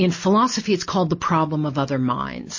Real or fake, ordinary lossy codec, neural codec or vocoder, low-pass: real; MP3, 32 kbps; none; 7.2 kHz